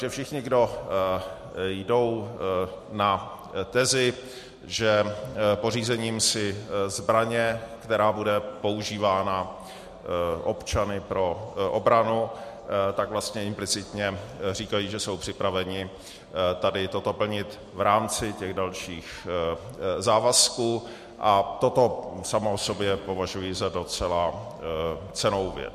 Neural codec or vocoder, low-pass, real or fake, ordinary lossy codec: none; 14.4 kHz; real; MP3, 64 kbps